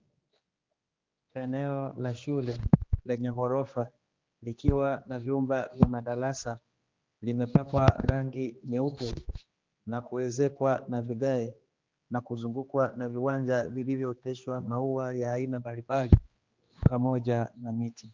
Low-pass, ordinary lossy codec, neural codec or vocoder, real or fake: 7.2 kHz; Opus, 24 kbps; codec, 16 kHz, 2 kbps, X-Codec, HuBERT features, trained on general audio; fake